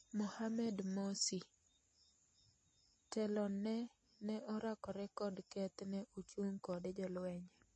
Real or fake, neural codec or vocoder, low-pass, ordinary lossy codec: real; none; 9.9 kHz; MP3, 32 kbps